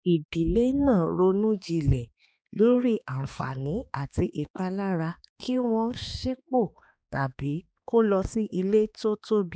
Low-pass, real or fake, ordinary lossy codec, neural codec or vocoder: none; fake; none; codec, 16 kHz, 2 kbps, X-Codec, HuBERT features, trained on balanced general audio